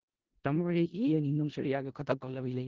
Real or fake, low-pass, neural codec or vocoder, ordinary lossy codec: fake; 7.2 kHz; codec, 16 kHz in and 24 kHz out, 0.4 kbps, LongCat-Audio-Codec, four codebook decoder; Opus, 16 kbps